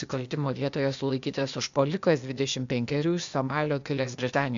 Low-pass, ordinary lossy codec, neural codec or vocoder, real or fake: 7.2 kHz; MP3, 64 kbps; codec, 16 kHz, 0.8 kbps, ZipCodec; fake